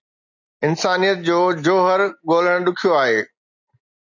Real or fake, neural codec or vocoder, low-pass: real; none; 7.2 kHz